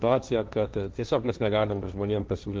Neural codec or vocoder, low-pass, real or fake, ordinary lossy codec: codec, 16 kHz, 1.1 kbps, Voila-Tokenizer; 7.2 kHz; fake; Opus, 32 kbps